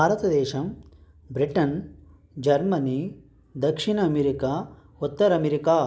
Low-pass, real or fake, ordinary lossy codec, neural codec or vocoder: none; real; none; none